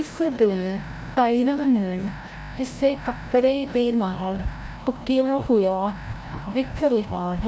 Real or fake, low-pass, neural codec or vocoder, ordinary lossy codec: fake; none; codec, 16 kHz, 0.5 kbps, FreqCodec, larger model; none